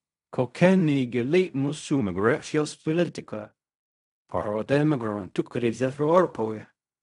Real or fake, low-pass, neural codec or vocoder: fake; 10.8 kHz; codec, 16 kHz in and 24 kHz out, 0.4 kbps, LongCat-Audio-Codec, fine tuned four codebook decoder